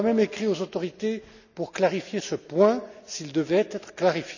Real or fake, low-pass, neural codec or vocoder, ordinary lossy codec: real; 7.2 kHz; none; none